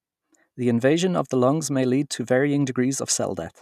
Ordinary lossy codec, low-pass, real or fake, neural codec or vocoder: none; 14.4 kHz; real; none